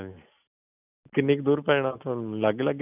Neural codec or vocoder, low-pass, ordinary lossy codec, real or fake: none; 3.6 kHz; none; real